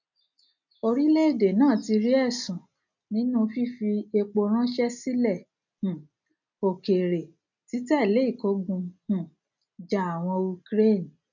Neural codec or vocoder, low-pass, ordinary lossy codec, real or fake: none; 7.2 kHz; none; real